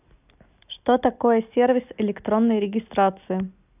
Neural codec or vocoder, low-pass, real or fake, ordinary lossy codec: none; 3.6 kHz; real; none